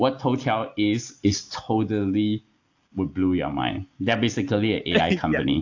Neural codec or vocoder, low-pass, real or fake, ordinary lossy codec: none; 7.2 kHz; real; AAC, 48 kbps